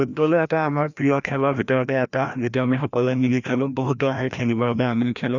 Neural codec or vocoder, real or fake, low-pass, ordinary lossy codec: codec, 16 kHz, 1 kbps, FreqCodec, larger model; fake; 7.2 kHz; none